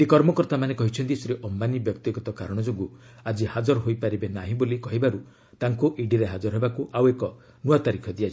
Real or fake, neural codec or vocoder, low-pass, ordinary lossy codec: real; none; none; none